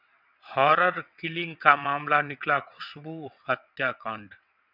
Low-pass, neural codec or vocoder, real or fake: 5.4 kHz; vocoder, 22.05 kHz, 80 mel bands, WaveNeXt; fake